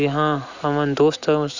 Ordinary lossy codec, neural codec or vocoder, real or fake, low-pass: Opus, 64 kbps; none; real; 7.2 kHz